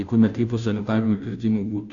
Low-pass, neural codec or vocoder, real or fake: 7.2 kHz; codec, 16 kHz, 0.5 kbps, FunCodec, trained on Chinese and English, 25 frames a second; fake